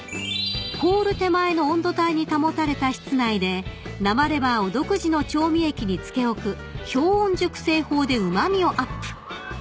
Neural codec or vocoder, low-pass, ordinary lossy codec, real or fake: none; none; none; real